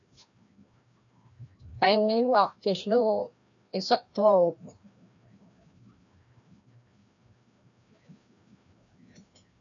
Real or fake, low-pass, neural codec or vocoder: fake; 7.2 kHz; codec, 16 kHz, 1 kbps, FreqCodec, larger model